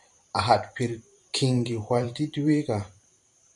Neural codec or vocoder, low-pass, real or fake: vocoder, 44.1 kHz, 128 mel bands every 512 samples, BigVGAN v2; 10.8 kHz; fake